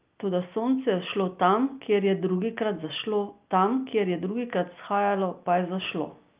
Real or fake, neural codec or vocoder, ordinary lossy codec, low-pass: real; none; Opus, 24 kbps; 3.6 kHz